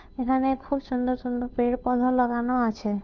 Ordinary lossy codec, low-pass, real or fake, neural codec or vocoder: none; 7.2 kHz; fake; codec, 16 kHz, 2 kbps, FunCodec, trained on Chinese and English, 25 frames a second